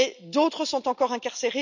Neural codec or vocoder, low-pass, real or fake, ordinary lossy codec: none; 7.2 kHz; real; none